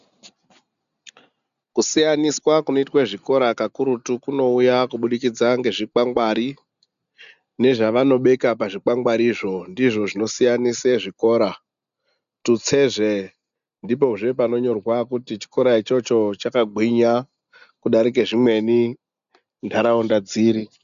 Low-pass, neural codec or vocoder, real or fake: 7.2 kHz; none; real